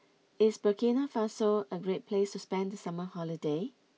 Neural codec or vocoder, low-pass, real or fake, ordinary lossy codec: none; none; real; none